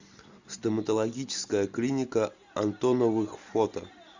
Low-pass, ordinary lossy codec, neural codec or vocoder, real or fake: 7.2 kHz; Opus, 64 kbps; none; real